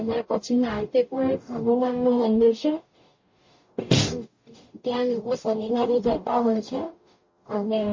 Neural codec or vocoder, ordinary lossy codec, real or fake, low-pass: codec, 44.1 kHz, 0.9 kbps, DAC; MP3, 32 kbps; fake; 7.2 kHz